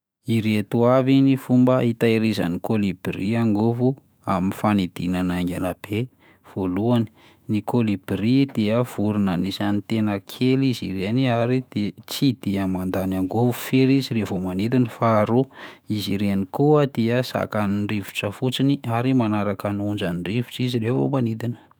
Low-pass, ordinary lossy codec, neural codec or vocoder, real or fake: none; none; autoencoder, 48 kHz, 128 numbers a frame, DAC-VAE, trained on Japanese speech; fake